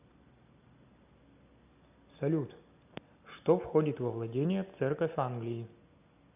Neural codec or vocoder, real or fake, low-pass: none; real; 3.6 kHz